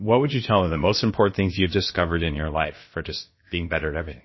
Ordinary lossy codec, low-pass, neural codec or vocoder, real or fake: MP3, 24 kbps; 7.2 kHz; codec, 16 kHz, about 1 kbps, DyCAST, with the encoder's durations; fake